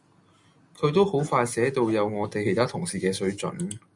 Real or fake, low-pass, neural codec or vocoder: real; 10.8 kHz; none